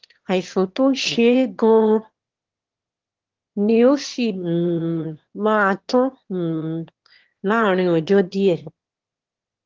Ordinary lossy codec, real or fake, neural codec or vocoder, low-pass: Opus, 16 kbps; fake; autoencoder, 22.05 kHz, a latent of 192 numbers a frame, VITS, trained on one speaker; 7.2 kHz